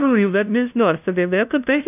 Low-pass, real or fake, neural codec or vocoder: 3.6 kHz; fake; codec, 16 kHz, 0.5 kbps, FunCodec, trained on LibriTTS, 25 frames a second